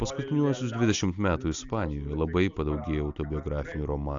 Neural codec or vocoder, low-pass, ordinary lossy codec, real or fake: none; 7.2 kHz; AAC, 64 kbps; real